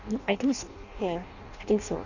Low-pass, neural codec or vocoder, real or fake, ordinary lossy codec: 7.2 kHz; codec, 16 kHz in and 24 kHz out, 0.6 kbps, FireRedTTS-2 codec; fake; none